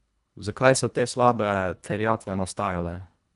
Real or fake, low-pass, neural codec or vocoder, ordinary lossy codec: fake; 10.8 kHz; codec, 24 kHz, 1.5 kbps, HILCodec; none